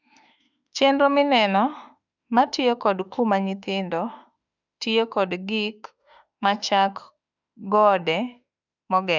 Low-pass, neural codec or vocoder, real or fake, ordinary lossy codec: 7.2 kHz; autoencoder, 48 kHz, 32 numbers a frame, DAC-VAE, trained on Japanese speech; fake; none